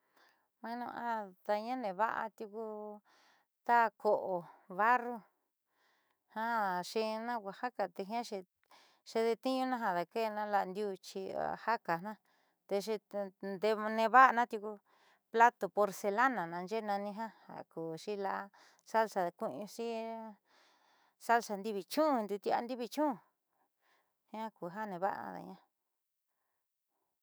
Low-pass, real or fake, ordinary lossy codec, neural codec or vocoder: none; fake; none; autoencoder, 48 kHz, 128 numbers a frame, DAC-VAE, trained on Japanese speech